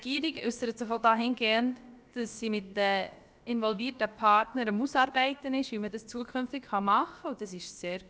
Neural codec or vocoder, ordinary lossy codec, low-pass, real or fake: codec, 16 kHz, about 1 kbps, DyCAST, with the encoder's durations; none; none; fake